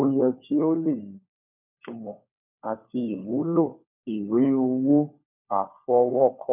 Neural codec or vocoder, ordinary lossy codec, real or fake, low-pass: codec, 16 kHz, 4 kbps, FunCodec, trained on LibriTTS, 50 frames a second; none; fake; 3.6 kHz